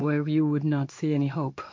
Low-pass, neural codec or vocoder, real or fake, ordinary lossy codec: 7.2 kHz; codec, 16 kHz in and 24 kHz out, 1 kbps, XY-Tokenizer; fake; MP3, 48 kbps